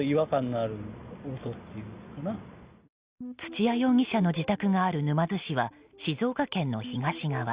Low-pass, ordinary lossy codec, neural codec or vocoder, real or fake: 3.6 kHz; Opus, 64 kbps; none; real